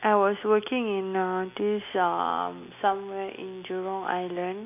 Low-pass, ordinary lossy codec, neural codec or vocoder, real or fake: 3.6 kHz; none; none; real